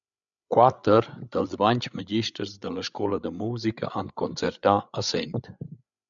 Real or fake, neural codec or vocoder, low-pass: fake; codec, 16 kHz, 8 kbps, FreqCodec, larger model; 7.2 kHz